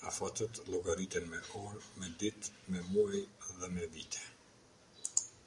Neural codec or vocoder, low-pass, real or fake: none; 10.8 kHz; real